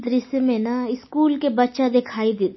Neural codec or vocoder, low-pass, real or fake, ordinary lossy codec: none; 7.2 kHz; real; MP3, 24 kbps